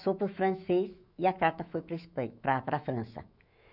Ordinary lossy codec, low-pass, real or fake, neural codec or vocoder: none; 5.4 kHz; fake; autoencoder, 48 kHz, 128 numbers a frame, DAC-VAE, trained on Japanese speech